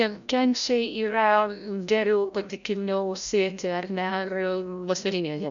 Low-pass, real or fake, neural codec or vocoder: 7.2 kHz; fake; codec, 16 kHz, 0.5 kbps, FreqCodec, larger model